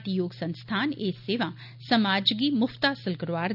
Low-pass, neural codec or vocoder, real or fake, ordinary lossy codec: 5.4 kHz; none; real; none